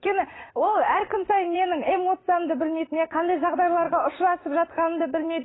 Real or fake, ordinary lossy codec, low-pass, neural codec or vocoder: fake; AAC, 16 kbps; 7.2 kHz; vocoder, 44.1 kHz, 80 mel bands, Vocos